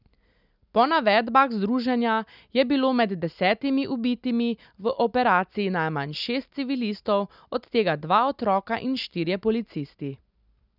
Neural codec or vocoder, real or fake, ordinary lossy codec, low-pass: none; real; none; 5.4 kHz